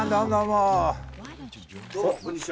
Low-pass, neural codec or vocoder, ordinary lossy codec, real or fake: none; none; none; real